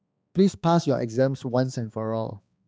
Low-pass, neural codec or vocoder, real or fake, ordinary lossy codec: none; codec, 16 kHz, 2 kbps, X-Codec, HuBERT features, trained on balanced general audio; fake; none